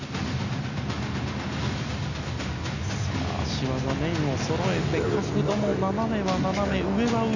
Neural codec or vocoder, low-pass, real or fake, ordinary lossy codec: none; 7.2 kHz; real; none